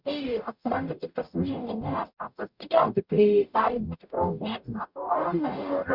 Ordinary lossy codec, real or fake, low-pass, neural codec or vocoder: Opus, 64 kbps; fake; 5.4 kHz; codec, 44.1 kHz, 0.9 kbps, DAC